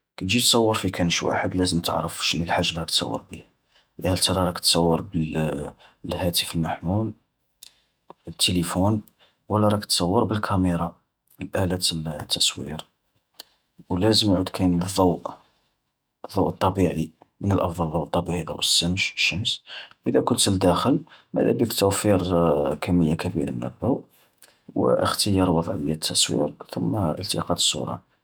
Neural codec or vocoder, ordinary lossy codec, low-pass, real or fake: autoencoder, 48 kHz, 128 numbers a frame, DAC-VAE, trained on Japanese speech; none; none; fake